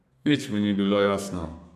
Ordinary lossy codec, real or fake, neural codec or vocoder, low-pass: none; fake; codec, 32 kHz, 1.9 kbps, SNAC; 14.4 kHz